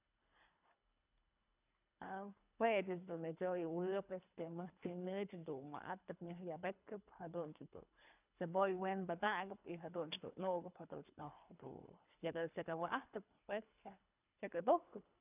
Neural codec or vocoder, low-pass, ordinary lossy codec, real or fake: codec, 24 kHz, 3 kbps, HILCodec; 3.6 kHz; none; fake